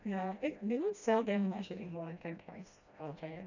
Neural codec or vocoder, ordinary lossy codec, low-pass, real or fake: codec, 16 kHz, 1 kbps, FreqCodec, smaller model; none; 7.2 kHz; fake